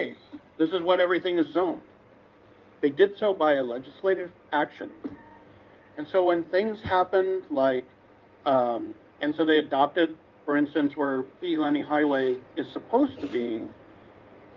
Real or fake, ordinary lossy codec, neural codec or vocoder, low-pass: fake; Opus, 24 kbps; codec, 16 kHz in and 24 kHz out, 2.2 kbps, FireRedTTS-2 codec; 7.2 kHz